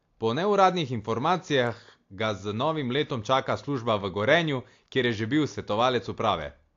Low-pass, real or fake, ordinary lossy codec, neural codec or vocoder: 7.2 kHz; real; AAC, 48 kbps; none